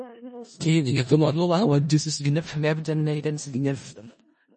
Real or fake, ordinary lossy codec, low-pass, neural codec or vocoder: fake; MP3, 32 kbps; 10.8 kHz; codec, 16 kHz in and 24 kHz out, 0.4 kbps, LongCat-Audio-Codec, four codebook decoder